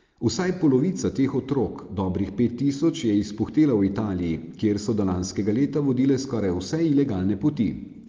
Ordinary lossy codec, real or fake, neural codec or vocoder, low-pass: Opus, 32 kbps; real; none; 7.2 kHz